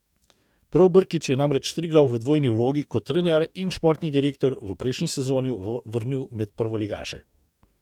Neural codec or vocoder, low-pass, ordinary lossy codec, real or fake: codec, 44.1 kHz, 2.6 kbps, DAC; 19.8 kHz; none; fake